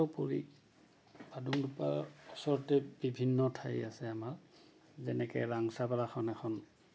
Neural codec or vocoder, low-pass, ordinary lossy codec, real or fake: none; none; none; real